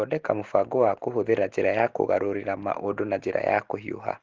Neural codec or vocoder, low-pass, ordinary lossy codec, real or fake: none; 7.2 kHz; Opus, 16 kbps; real